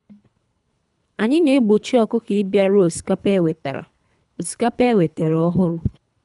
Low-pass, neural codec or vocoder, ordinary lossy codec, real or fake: 10.8 kHz; codec, 24 kHz, 3 kbps, HILCodec; none; fake